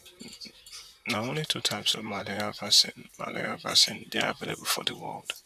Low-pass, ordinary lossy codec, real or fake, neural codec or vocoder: 14.4 kHz; none; fake; vocoder, 44.1 kHz, 128 mel bands, Pupu-Vocoder